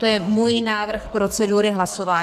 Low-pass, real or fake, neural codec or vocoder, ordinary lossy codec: 14.4 kHz; fake; codec, 44.1 kHz, 2.6 kbps, SNAC; AAC, 96 kbps